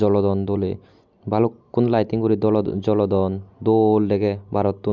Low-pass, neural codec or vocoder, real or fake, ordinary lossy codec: 7.2 kHz; none; real; none